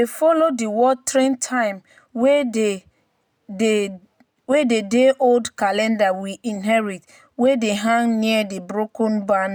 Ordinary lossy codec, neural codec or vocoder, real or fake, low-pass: none; none; real; none